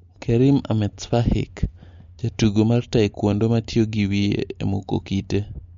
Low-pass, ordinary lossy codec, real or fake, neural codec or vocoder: 7.2 kHz; MP3, 48 kbps; real; none